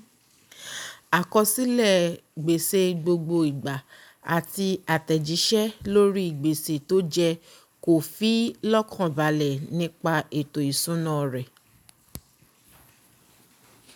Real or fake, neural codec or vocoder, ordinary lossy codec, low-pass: real; none; none; none